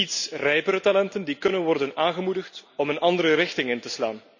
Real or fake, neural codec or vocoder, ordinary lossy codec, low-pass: real; none; none; 7.2 kHz